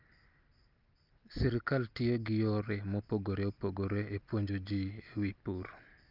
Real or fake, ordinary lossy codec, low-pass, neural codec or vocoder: real; Opus, 24 kbps; 5.4 kHz; none